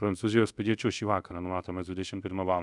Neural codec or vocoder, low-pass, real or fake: codec, 24 kHz, 0.5 kbps, DualCodec; 10.8 kHz; fake